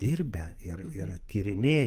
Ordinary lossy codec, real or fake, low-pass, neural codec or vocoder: Opus, 32 kbps; fake; 14.4 kHz; codec, 44.1 kHz, 2.6 kbps, SNAC